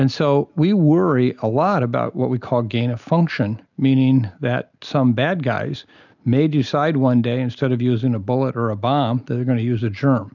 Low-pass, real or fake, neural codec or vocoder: 7.2 kHz; real; none